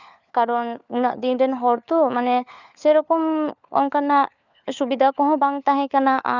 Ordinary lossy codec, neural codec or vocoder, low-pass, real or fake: none; codec, 16 kHz, 4 kbps, FunCodec, trained on LibriTTS, 50 frames a second; 7.2 kHz; fake